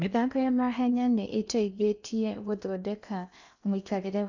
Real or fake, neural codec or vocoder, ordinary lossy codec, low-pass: fake; codec, 16 kHz in and 24 kHz out, 0.8 kbps, FocalCodec, streaming, 65536 codes; none; 7.2 kHz